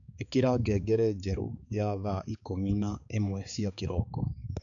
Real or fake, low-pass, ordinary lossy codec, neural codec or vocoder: fake; 7.2 kHz; none; codec, 16 kHz, 4 kbps, X-Codec, HuBERT features, trained on balanced general audio